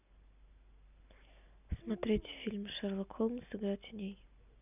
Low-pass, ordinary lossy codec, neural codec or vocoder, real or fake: 3.6 kHz; none; none; real